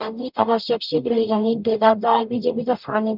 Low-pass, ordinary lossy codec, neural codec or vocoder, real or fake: 5.4 kHz; none; codec, 44.1 kHz, 0.9 kbps, DAC; fake